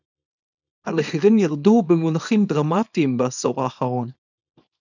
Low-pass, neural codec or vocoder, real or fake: 7.2 kHz; codec, 24 kHz, 0.9 kbps, WavTokenizer, small release; fake